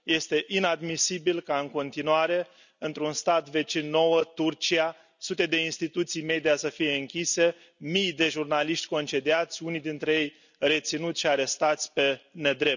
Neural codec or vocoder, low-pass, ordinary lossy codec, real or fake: none; 7.2 kHz; none; real